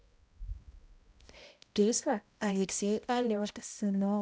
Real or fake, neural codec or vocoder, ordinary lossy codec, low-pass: fake; codec, 16 kHz, 0.5 kbps, X-Codec, HuBERT features, trained on balanced general audio; none; none